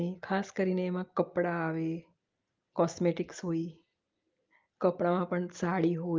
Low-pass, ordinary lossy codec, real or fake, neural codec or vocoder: 7.2 kHz; Opus, 32 kbps; real; none